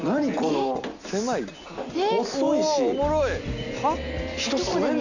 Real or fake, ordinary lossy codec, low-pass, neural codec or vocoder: real; none; 7.2 kHz; none